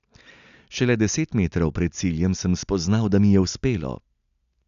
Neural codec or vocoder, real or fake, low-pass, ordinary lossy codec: none; real; 7.2 kHz; none